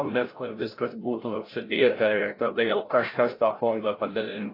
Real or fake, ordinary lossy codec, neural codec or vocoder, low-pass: fake; AAC, 24 kbps; codec, 16 kHz, 0.5 kbps, FreqCodec, larger model; 5.4 kHz